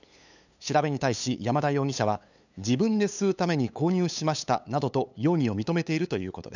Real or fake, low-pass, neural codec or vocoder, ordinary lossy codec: fake; 7.2 kHz; codec, 16 kHz, 8 kbps, FunCodec, trained on LibriTTS, 25 frames a second; none